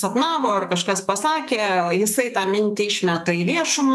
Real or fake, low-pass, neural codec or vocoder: fake; 14.4 kHz; codec, 44.1 kHz, 2.6 kbps, SNAC